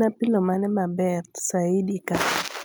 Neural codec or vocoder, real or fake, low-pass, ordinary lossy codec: none; real; none; none